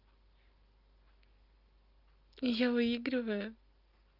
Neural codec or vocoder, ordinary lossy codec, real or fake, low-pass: none; Opus, 24 kbps; real; 5.4 kHz